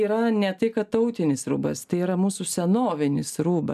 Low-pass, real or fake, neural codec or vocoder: 14.4 kHz; real; none